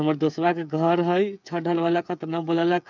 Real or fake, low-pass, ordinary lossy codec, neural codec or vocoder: fake; 7.2 kHz; none; codec, 16 kHz, 8 kbps, FreqCodec, smaller model